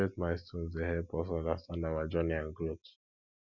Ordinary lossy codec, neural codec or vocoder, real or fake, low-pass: none; none; real; 7.2 kHz